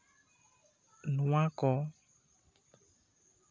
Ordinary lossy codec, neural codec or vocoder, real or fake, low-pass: none; none; real; none